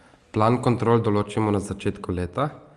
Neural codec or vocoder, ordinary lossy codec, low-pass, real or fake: none; Opus, 32 kbps; 10.8 kHz; real